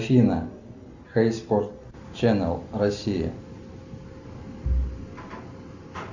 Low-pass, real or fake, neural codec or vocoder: 7.2 kHz; real; none